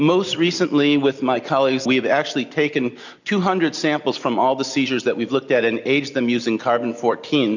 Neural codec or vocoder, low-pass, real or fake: none; 7.2 kHz; real